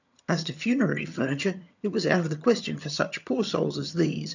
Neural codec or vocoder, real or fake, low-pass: vocoder, 22.05 kHz, 80 mel bands, HiFi-GAN; fake; 7.2 kHz